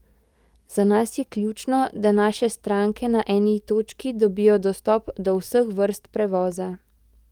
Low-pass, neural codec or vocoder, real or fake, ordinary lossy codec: 19.8 kHz; codec, 44.1 kHz, 7.8 kbps, DAC; fake; Opus, 32 kbps